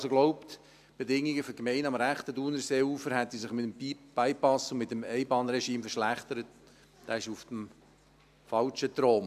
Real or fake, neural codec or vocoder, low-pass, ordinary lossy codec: real; none; 14.4 kHz; AAC, 96 kbps